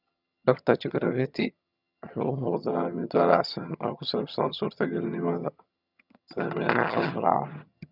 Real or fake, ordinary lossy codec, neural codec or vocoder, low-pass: fake; none; vocoder, 22.05 kHz, 80 mel bands, HiFi-GAN; 5.4 kHz